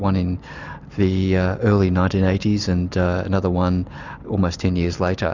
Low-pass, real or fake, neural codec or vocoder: 7.2 kHz; real; none